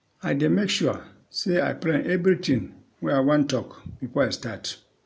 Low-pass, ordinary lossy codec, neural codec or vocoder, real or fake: none; none; none; real